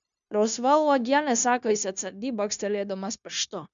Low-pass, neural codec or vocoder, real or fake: 7.2 kHz; codec, 16 kHz, 0.9 kbps, LongCat-Audio-Codec; fake